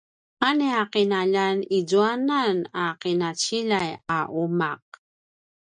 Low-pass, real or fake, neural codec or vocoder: 9.9 kHz; real; none